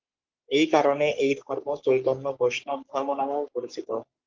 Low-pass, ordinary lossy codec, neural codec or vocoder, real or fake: 7.2 kHz; Opus, 24 kbps; codec, 44.1 kHz, 3.4 kbps, Pupu-Codec; fake